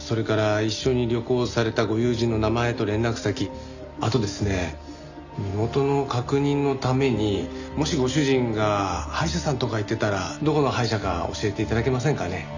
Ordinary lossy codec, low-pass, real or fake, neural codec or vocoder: none; 7.2 kHz; real; none